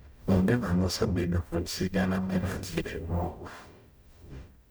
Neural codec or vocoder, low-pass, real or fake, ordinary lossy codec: codec, 44.1 kHz, 0.9 kbps, DAC; none; fake; none